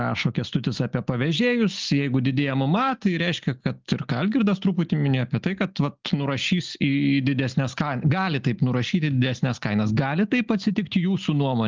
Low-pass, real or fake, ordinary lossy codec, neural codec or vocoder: 7.2 kHz; real; Opus, 24 kbps; none